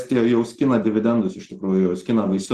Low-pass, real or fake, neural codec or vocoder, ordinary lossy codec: 14.4 kHz; real; none; Opus, 16 kbps